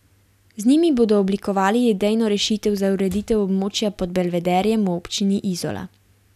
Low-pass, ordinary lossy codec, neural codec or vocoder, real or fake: 14.4 kHz; none; none; real